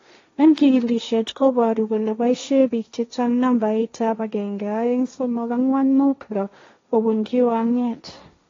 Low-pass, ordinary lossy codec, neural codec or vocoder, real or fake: 7.2 kHz; AAC, 32 kbps; codec, 16 kHz, 1.1 kbps, Voila-Tokenizer; fake